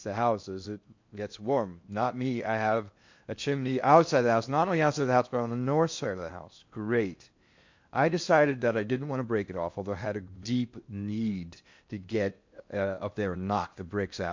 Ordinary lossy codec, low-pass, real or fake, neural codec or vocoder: MP3, 48 kbps; 7.2 kHz; fake; codec, 16 kHz in and 24 kHz out, 0.8 kbps, FocalCodec, streaming, 65536 codes